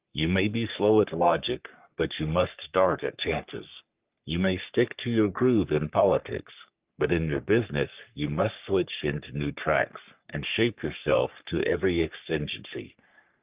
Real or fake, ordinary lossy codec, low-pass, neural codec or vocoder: fake; Opus, 24 kbps; 3.6 kHz; codec, 44.1 kHz, 3.4 kbps, Pupu-Codec